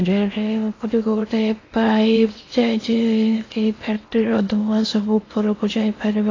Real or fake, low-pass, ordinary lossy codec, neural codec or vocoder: fake; 7.2 kHz; AAC, 32 kbps; codec, 16 kHz in and 24 kHz out, 0.8 kbps, FocalCodec, streaming, 65536 codes